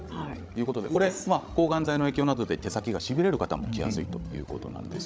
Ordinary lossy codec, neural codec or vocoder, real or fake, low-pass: none; codec, 16 kHz, 16 kbps, FreqCodec, larger model; fake; none